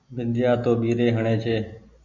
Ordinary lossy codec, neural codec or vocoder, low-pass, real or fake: MP3, 64 kbps; none; 7.2 kHz; real